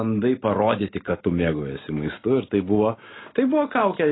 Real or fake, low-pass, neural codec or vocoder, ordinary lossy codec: real; 7.2 kHz; none; AAC, 16 kbps